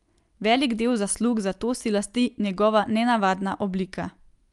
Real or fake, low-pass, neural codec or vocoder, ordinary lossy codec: real; 10.8 kHz; none; Opus, 32 kbps